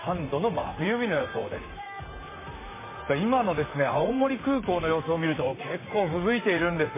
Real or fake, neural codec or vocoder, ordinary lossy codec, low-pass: fake; vocoder, 44.1 kHz, 128 mel bands, Pupu-Vocoder; MP3, 16 kbps; 3.6 kHz